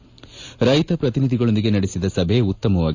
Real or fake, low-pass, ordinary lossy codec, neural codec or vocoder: real; 7.2 kHz; AAC, 48 kbps; none